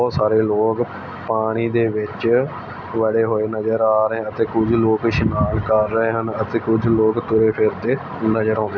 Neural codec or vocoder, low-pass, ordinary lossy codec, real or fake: none; none; none; real